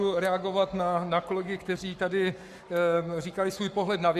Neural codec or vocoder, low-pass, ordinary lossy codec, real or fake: codec, 44.1 kHz, 7.8 kbps, Pupu-Codec; 14.4 kHz; Opus, 64 kbps; fake